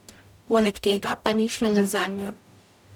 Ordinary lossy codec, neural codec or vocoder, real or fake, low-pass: none; codec, 44.1 kHz, 0.9 kbps, DAC; fake; 19.8 kHz